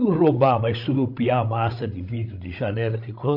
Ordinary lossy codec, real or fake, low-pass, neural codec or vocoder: AAC, 48 kbps; fake; 5.4 kHz; codec, 16 kHz, 16 kbps, FreqCodec, larger model